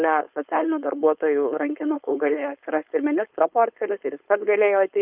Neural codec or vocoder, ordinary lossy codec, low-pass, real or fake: codec, 16 kHz, 4.8 kbps, FACodec; Opus, 24 kbps; 3.6 kHz; fake